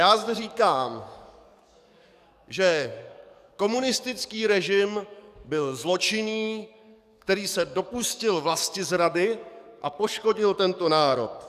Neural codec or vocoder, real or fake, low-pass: codec, 44.1 kHz, 7.8 kbps, DAC; fake; 14.4 kHz